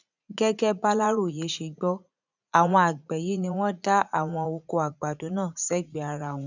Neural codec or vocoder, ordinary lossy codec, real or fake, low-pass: vocoder, 44.1 kHz, 80 mel bands, Vocos; none; fake; 7.2 kHz